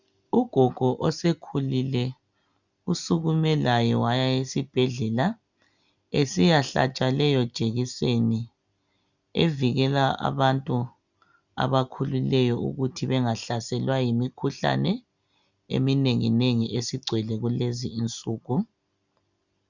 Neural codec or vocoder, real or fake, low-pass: none; real; 7.2 kHz